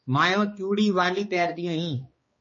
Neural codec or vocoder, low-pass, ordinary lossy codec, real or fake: codec, 16 kHz, 2 kbps, X-Codec, HuBERT features, trained on balanced general audio; 7.2 kHz; MP3, 32 kbps; fake